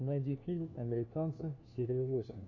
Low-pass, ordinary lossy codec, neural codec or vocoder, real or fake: 7.2 kHz; AAC, 48 kbps; codec, 16 kHz, 1 kbps, FunCodec, trained on LibriTTS, 50 frames a second; fake